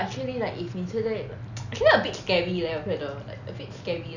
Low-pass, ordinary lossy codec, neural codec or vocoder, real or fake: 7.2 kHz; none; none; real